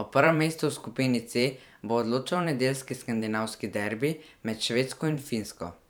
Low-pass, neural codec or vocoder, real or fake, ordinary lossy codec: none; vocoder, 44.1 kHz, 128 mel bands every 512 samples, BigVGAN v2; fake; none